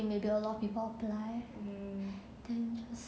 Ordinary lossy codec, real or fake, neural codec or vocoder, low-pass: none; real; none; none